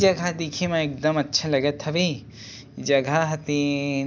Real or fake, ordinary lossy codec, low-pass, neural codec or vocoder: real; none; none; none